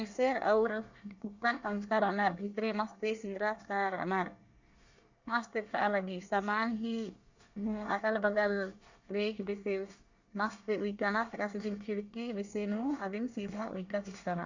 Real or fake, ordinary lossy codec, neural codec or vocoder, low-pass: fake; Opus, 64 kbps; codec, 24 kHz, 1 kbps, SNAC; 7.2 kHz